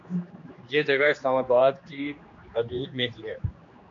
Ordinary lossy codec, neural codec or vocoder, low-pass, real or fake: MP3, 64 kbps; codec, 16 kHz, 2 kbps, X-Codec, HuBERT features, trained on general audio; 7.2 kHz; fake